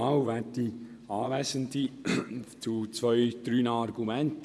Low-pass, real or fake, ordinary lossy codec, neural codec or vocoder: none; fake; none; vocoder, 24 kHz, 100 mel bands, Vocos